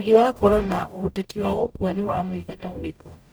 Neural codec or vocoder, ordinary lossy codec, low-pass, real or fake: codec, 44.1 kHz, 0.9 kbps, DAC; none; none; fake